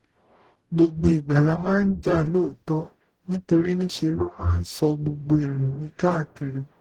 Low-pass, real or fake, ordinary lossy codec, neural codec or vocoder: 19.8 kHz; fake; Opus, 16 kbps; codec, 44.1 kHz, 0.9 kbps, DAC